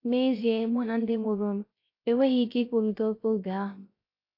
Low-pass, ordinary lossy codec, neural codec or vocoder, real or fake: 5.4 kHz; AAC, 32 kbps; codec, 16 kHz, 0.3 kbps, FocalCodec; fake